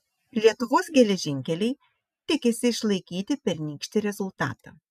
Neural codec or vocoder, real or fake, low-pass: none; real; 14.4 kHz